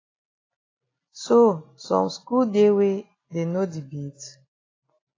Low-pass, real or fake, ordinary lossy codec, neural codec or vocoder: 7.2 kHz; real; AAC, 32 kbps; none